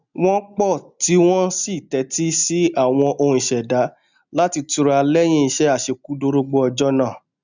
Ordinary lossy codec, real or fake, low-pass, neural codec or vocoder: none; real; 7.2 kHz; none